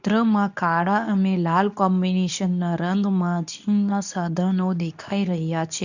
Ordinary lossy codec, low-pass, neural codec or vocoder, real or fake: none; 7.2 kHz; codec, 24 kHz, 0.9 kbps, WavTokenizer, medium speech release version 2; fake